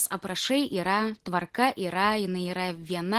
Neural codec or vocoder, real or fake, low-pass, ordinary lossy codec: none; real; 14.4 kHz; Opus, 16 kbps